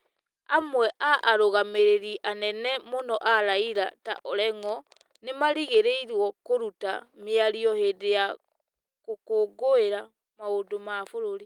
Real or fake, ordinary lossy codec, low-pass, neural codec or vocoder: real; Opus, 32 kbps; 19.8 kHz; none